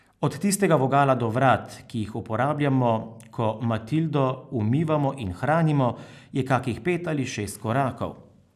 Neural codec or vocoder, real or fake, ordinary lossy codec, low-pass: none; real; none; 14.4 kHz